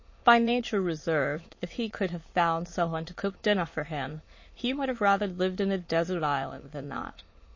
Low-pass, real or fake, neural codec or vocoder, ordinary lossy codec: 7.2 kHz; fake; autoencoder, 22.05 kHz, a latent of 192 numbers a frame, VITS, trained on many speakers; MP3, 32 kbps